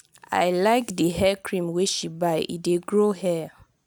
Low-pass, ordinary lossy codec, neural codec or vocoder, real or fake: none; none; none; real